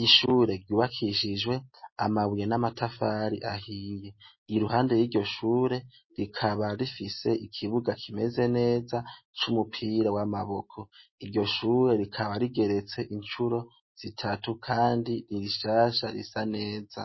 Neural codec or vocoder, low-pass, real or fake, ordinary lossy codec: none; 7.2 kHz; real; MP3, 24 kbps